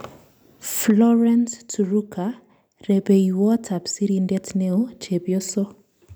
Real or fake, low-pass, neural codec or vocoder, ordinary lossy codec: real; none; none; none